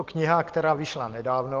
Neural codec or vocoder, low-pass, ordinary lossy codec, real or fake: none; 7.2 kHz; Opus, 16 kbps; real